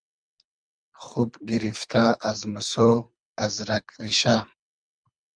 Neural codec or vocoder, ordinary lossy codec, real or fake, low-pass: codec, 24 kHz, 3 kbps, HILCodec; MP3, 96 kbps; fake; 9.9 kHz